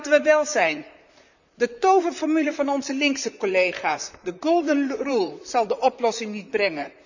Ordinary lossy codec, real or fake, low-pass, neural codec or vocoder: none; fake; 7.2 kHz; vocoder, 44.1 kHz, 128 mel bands, Pupu-Vocoder